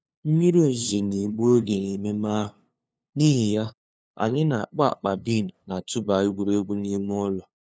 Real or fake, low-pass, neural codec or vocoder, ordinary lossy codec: fake; none; codec, 16 kHz, 2 kbps, FunCodec, trained on LibriTTS, 25 frames a second; none